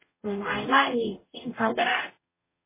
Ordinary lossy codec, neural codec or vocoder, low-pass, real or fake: MP3, 16 kbps; codec, 44.1 kHz, 0.9 kbps, DAC; 3.6 kHz; fake